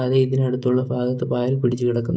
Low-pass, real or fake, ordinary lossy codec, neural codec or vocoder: none; fake; none; codec, 16 kHz, 16 kbps, FreqCodec, smaller model